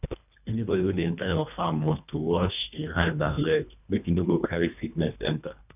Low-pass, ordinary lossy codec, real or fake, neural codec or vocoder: 3.6 kHz; none; fake; codec, 24 kHz, 1.5 kbps, HILCodec